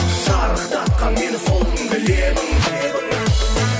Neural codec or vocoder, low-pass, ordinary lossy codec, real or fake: none; none; none; real